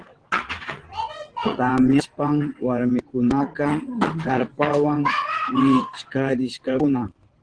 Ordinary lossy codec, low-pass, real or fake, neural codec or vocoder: Opus, 16 kbps; 9.9 kHz; fake; vocoder, 22.05 kHz, 80 mel bands, WaveNeXt